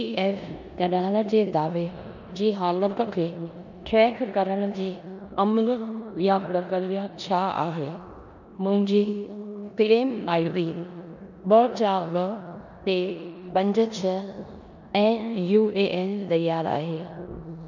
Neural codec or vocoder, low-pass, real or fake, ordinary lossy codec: codec, 16 kHz in and 24 kHz out, 0.9 kbps, LongCat-Audio-Codec, four codebook decoder; 7.2 kHz; fake; none